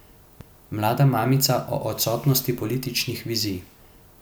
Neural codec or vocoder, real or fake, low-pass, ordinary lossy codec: none; real; none; none